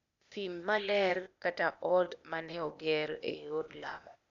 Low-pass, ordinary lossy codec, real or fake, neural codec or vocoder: 7.2 kHz; none; fake; codec, 16 kHz, 0.8 kbps, ZipCodec